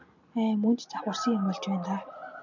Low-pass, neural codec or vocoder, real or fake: 7.2 kHz; none; real